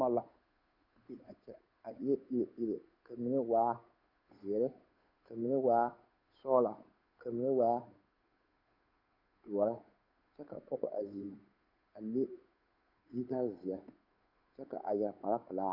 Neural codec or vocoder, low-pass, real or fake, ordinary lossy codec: codec, 16 kHz, 8 kbps, FunCodec, trained on Chinese and English, 25 frames a second; 5.4 kHz; fake; Opus, 64 kbps